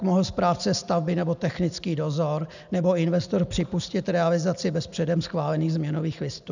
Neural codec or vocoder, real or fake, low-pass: none; real; 7.2 kHz